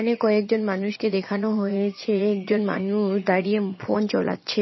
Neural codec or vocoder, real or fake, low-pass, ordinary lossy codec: vocoder, 22.05 kHz, 80 mel bands, Vocos; fake; 7.2 kHz; MP3, 24 kbps